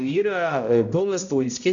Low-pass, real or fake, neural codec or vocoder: 7.2 kHz; fake; codec, 16 kHz, 0.5 kbps, X-Codec, HuBERT features, trained on balanced general audio